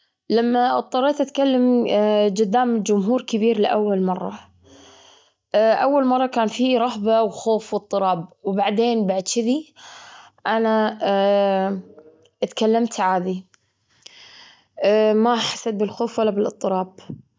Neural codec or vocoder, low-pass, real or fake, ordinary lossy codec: none; none; real; none